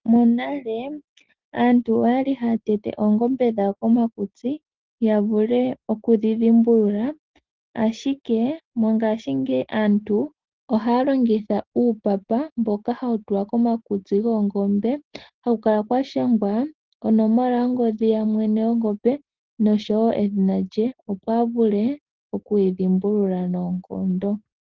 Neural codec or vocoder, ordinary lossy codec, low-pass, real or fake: none; Opus, 16 kbps; 7.2 kHz; real